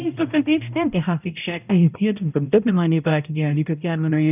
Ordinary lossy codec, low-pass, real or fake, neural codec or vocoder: none; 3.6 kHz; fake; codec, 16 kHz, 0.5 kbps, X-Codec, HuBERT features, trained on balanced general audio